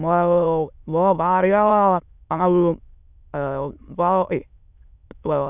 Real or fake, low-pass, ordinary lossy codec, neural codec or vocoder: fake; 3.6 kHz; none; autoencoder, 22.05 kHz, a latent of 192 numbers a frame, VITS, trained on many speakers